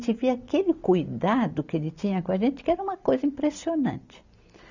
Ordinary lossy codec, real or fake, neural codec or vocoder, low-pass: none; real; none; 7.2 kHz